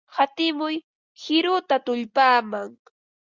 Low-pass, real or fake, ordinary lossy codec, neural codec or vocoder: 7.2 kHz; real; Opus, 64 kbps; none